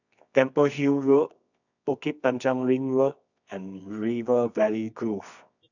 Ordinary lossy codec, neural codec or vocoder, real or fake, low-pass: none; codec, 24 kHz, 0.9 kbps, WavTokenizer, medium music audio release; fake; 7.2 kHz